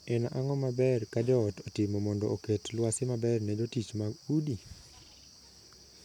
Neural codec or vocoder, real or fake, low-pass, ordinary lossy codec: none; real; 19.8 kHz; none